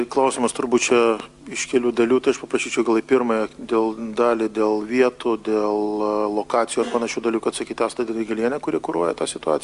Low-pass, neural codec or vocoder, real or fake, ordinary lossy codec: 10.8 kHz; none; real; Opus, 64 kbps